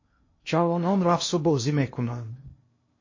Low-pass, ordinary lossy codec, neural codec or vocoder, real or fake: 7.2 kHz; MP3, 32 kbps; codec, 16 kHz in and 24 kHz out, 0.6 kbps, FocalCodec, streaming, 2048 codes; fake